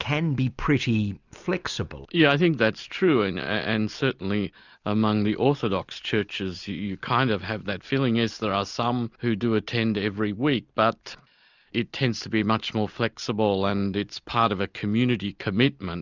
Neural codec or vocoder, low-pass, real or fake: none; 7.2 kHz; real